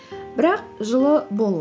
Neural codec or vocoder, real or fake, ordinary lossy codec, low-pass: none; real; none; none